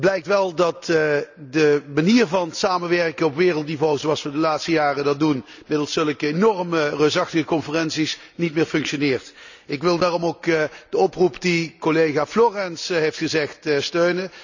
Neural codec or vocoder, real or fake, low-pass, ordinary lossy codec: none; real; 7.2 kHz; none